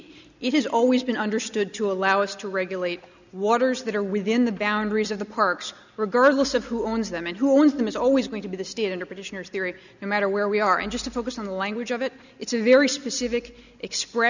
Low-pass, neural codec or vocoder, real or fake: 7.2 kHz; none; real